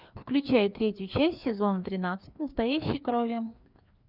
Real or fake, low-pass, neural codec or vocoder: fake; 5.4 kHz; codec, 16 kHz, 2 kbps, FreqCodec, larger model